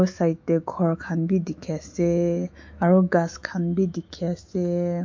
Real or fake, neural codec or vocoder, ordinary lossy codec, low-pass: fake; autoencoder, 48 kHz, 128 numbers a frame, DAC-VAE, trained on Japanese speech; MP3, 48 kbps; 7.2 kHz